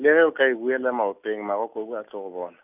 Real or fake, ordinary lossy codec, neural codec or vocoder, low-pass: real; none; none; 3.6 kHz